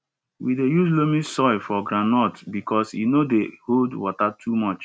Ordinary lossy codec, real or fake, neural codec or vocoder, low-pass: none; real; none; none